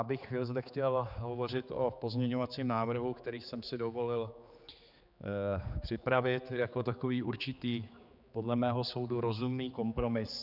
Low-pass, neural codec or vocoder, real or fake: 5.4 kHz; codec, 16 kHz, 4 kbps, X-Codec, HuBERT features, trained on general audio; fake